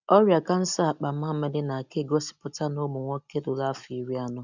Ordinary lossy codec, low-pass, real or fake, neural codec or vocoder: none; 7.2 kHz; real; none